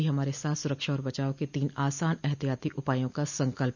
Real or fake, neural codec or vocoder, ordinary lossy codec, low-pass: real; none; none; 7.2 kHz